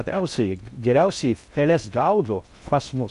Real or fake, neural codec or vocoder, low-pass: fake; codec, 16 kHz in and 24 kHz out, 0.6 kbps, FocalCodec, streaming, 4096 codes; 10.8 kHz